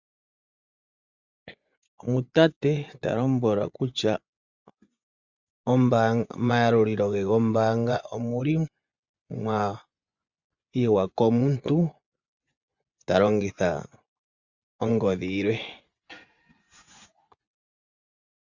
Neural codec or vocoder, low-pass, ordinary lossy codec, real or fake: vocoder, 24 kHz, 100 mel bands, Vocos; 7.2 kHz; Opus, 64 kbps; fake